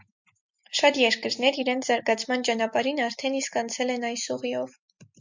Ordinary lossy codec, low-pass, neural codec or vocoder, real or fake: MP3, 64 kbps; 7.2 kHz; none; real